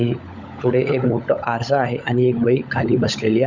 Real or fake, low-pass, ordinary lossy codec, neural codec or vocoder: fake; 7.2 kHz; none; codec, 16 kHz, 16 kbps, FunCodec, trained on LibriTTS, 50 frames a second